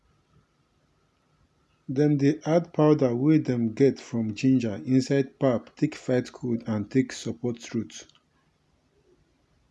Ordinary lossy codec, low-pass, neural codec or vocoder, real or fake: none; none; none; real